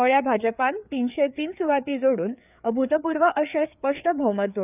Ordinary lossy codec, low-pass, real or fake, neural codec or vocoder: none; 3.6 kHz; fake; codec, 16 kHz, 4 kbps, FreqCodec, larger model